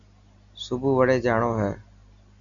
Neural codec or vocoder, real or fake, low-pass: none; real; 7.2 kHz